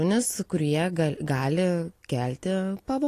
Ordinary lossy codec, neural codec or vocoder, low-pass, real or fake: AAC, 48 kbps; none; 14.4 kHz; real